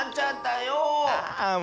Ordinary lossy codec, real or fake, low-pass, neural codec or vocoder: none; real; none; none